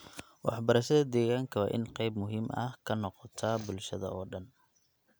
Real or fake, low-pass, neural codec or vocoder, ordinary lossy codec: real; none; none; none